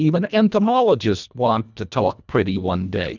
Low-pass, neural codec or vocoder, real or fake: 7.2 kHz; codec, 24 kHz, 1.5 kbps, HILCodec; fake